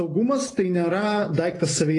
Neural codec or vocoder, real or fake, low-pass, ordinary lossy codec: none; real; 10.8 kHz; AAC, 32 kbps